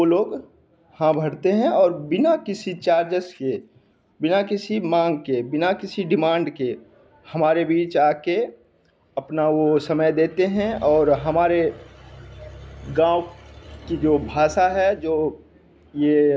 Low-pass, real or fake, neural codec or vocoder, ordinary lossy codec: none; real; none; none